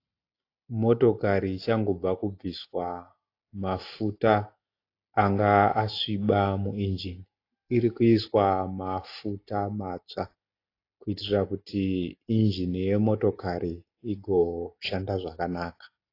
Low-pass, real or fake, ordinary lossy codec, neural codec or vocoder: 5.4 kHz; real; AAC, 32 kbps; none